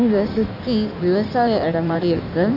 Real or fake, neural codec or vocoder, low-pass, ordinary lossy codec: fake; codec, 16 kHz in and 24 kHz out, 1.1 kbps, FireRedTTS-2 codec; 5.4 kHz; none